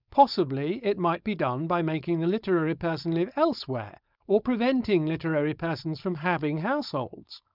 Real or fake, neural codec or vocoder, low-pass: fake; codec, 16 kHz, 4.8 kbps, FACodec; 5.4 kHz